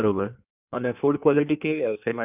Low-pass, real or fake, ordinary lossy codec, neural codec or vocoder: 3.6 kHz; fake; none; codec, 16 kHz in and 24 kHz out, 1.1 kbps, FireRedTTS-2 codec